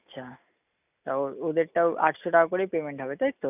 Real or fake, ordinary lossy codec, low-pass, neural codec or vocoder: real; none; 3.6 kHz; none